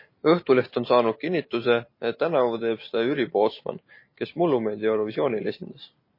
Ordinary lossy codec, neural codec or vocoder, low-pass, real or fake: MP3, 24 kbps; none; 5.4 kHz; real